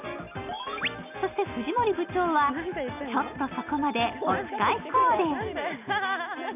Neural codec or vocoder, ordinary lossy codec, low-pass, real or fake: none; none; 3.6 kHz; real